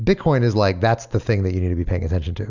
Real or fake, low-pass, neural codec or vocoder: real; 7.2 kHz; none